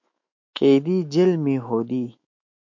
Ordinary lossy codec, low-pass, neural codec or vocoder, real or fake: MP3, 48 kbps; 7.2 kHz; autoencoder, 48 kHz, 128 numbers a frame, DAC-VAE, trained on Japanese speech; fake